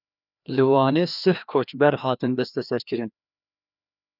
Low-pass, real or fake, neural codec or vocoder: 5.4 kHz; fake; codec, 16 kHz, 2 kbps, FreqCodec, larger model